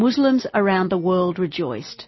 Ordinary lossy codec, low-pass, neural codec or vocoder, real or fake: MP3, 24 kbps; 7.2 kHz; none; real